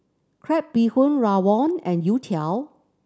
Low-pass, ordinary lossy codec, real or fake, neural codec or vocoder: none; none; real; none